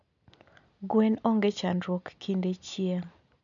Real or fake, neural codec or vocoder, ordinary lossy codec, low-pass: real; none; none; 7.2 kHz